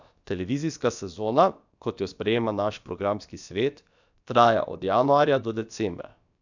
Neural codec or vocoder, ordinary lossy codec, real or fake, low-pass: codec, 16 kHz, about 1 kbps, DyCAST, with the encoder's durations; none; fake; 7.2 kHz